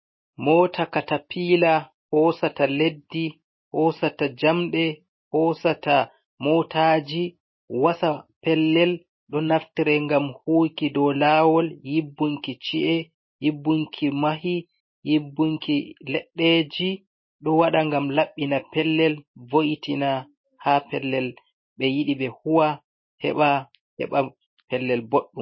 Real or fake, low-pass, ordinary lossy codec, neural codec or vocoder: real; 7.2 kHz; MP3, 24 kbps; none